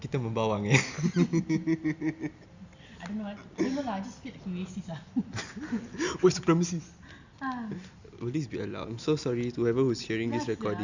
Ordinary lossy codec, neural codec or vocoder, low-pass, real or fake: Opus, 64 kbps; none; 7.2 kHz; real